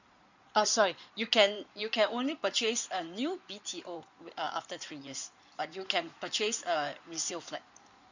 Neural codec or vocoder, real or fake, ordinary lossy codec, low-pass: codec, 16 kHz in and 24 kHz out, 2.2 kbps, FireRedTTS-2 codec; fake; none; 7.2 kHz